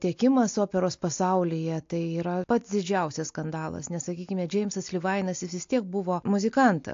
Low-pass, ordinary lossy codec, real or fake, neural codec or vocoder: 7.2 kHz; AAC, 48 kbps; real; none